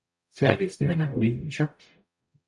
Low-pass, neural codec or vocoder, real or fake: 10.8 kHz; codec, 44.1 kHz, 0.9 kbps, DAC; fake